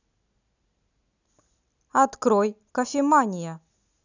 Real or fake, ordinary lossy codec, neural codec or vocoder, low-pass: real; none; none; 7.2 kHz